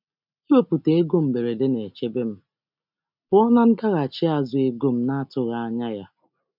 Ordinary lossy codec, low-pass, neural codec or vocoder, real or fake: none; 5.4 kHz; none; real